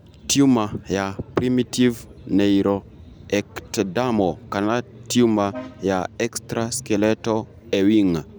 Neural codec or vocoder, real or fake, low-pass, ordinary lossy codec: none; real; none; none